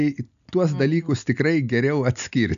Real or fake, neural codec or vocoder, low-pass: real; none; 7.2 kHz